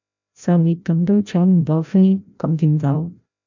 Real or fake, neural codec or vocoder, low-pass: fake; codec, 16 kHz, 0.5 kbps, FreqCodec, larger model; 7.2 kHz